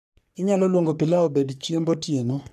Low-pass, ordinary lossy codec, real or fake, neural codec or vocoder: 14.4 kHz; none; fake; codec, 44.1 kHz, 3.4 kbps, Pupu-Codec